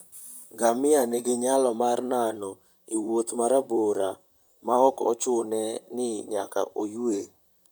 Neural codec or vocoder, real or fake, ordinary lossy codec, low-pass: vocoder, 44.1 kHz, 128 mel bands, Pupu-Vocoder; fake; none; none